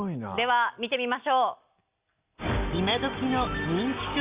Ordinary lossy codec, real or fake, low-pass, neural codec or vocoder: Opus, 64 kbps; real; 3.6 kHz; none